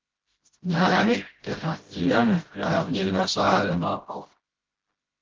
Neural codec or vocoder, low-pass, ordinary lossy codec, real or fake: codec, 16 kHz, 0.5 kbps, FreqCodec, smaller model; 7.2 kHz; Opus, 16 kbps; fake